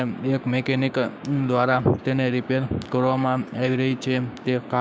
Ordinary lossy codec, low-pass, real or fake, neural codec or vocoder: none; none; fake; codec, 16 kHz, 6 kbps, DAC